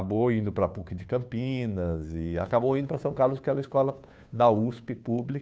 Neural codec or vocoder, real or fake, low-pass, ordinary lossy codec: codec, 16 kHz, 2 kbps, FunCodec, trained on Chinese and English, 25 frames a second; fake; none; none